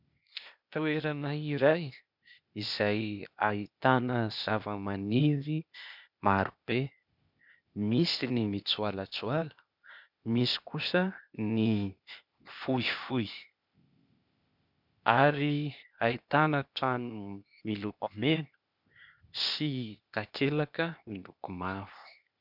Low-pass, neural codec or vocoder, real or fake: 5.4 kHz; codec, 16 kHz, 0.8 kbps, ZipCodec; fake